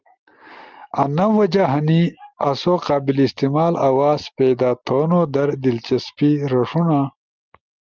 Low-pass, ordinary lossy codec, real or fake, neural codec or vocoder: 7.2 kHz; Opus, 32 kbps; real; none